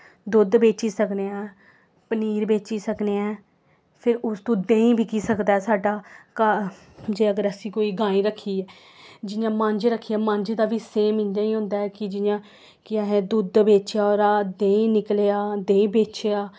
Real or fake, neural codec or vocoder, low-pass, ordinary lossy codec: real; none; none; none